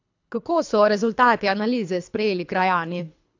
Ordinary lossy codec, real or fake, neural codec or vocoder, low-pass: AAC, 48 kbps; fake; codec, 24 kHz, 3 kbps, HILCodec; 7.2 kHz